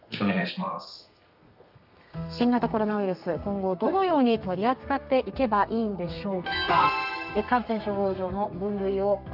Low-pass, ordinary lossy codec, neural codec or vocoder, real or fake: 5.4 kHz; none; codec, 32 kHz, 1.9 kbps, SNAC; fake